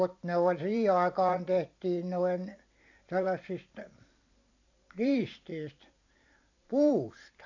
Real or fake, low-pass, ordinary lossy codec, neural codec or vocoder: fake; 7.2 kHz; AAC, 48 kbps; vocoder, 44.1 kHz, 128 mel bands every 512 samples, BigVGAN v2